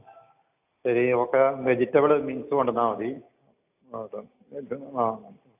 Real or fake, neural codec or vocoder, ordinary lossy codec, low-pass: real; none; AAC, 32 kbps; 3.6 kHz